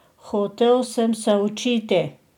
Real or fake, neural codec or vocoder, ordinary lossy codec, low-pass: real; none; none; 19.8 kHz